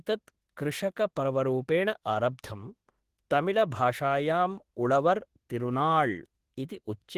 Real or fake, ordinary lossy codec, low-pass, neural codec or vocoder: fake; Opus, 16 kbps; 14.4 kHz; autoencoder, 48 kHz, 32 numbers a frame, DAC-VAE, trained on Japanese speech